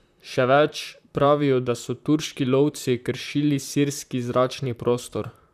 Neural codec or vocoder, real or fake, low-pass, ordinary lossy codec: vocoder, 44.1 kHz, 128 mel bands, Pupu-Vocoder; fake; 14.4 kHz; none